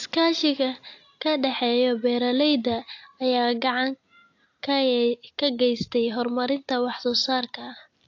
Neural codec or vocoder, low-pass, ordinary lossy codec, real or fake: none; 7.2 kHz; AAC, 48 kbps; real